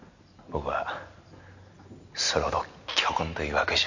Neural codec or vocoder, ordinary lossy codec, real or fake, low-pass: none; none; real; 7.2 kHz